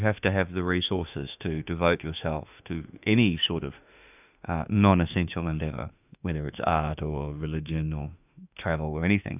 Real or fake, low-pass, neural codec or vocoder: fake; 3.6 kHz; autoencoder, 48 kHz, 32 numbers a frame, DAC-VAE, trained on Japanese speech